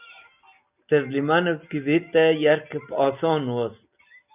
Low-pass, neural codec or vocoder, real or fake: 3.6 kHz; none; real